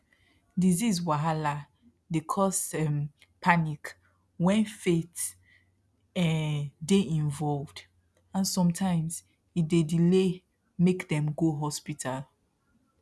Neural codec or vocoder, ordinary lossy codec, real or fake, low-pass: none; none; real; none